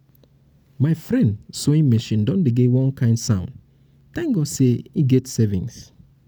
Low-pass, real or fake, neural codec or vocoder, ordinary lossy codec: none; real; none; none